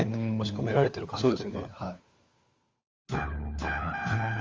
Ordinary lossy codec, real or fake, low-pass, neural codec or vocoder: Opus, 32 kbps; fake; 7.2 kHz; codec, 16 kHz, 4 kbps, FunCodec, trained on LibriTTS, 50 frames a second